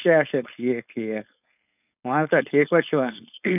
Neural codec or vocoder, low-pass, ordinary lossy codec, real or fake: codec, 16 kHz, 4.8 kbps, FACodec; 3.6 kHz; none; fake